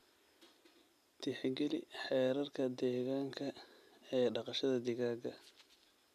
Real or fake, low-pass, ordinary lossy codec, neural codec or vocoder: real; 14.4 kHz; none; none